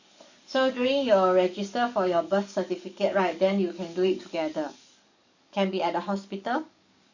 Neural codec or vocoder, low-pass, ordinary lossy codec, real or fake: codec, 44.1 kHz, 7.8 kbps, DAC; 7.2 kHz; none; fake